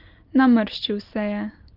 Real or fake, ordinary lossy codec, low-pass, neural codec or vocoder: real; Opus, 24 kbps; 5.4 kHz; none